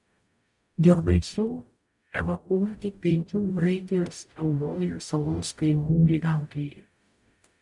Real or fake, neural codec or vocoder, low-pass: fake; codec, 44.1 kHz, 0.9 kbps, DAC; 10.8 kHz